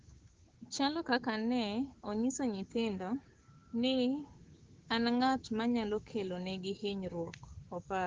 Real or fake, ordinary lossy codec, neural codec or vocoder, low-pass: fake; Opus, 16 kbps; codec, 16 kHz, 6 kbps, DAC; 7.2 kHz